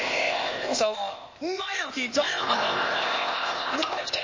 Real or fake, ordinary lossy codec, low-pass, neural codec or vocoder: fake; MP3, 32 kbps; 7.2 kHz; codec, 16 kHz, 0.8 kbps, ZipCodec